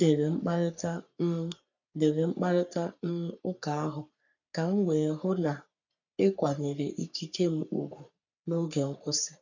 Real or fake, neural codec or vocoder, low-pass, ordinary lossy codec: fake; codec, 44.1 kHz, 3.4 kbps, Pupu-Codec; 7.2 kHz; none